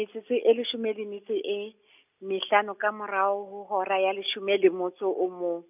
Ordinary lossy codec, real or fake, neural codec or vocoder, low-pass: none; real; none; 3.6 kHz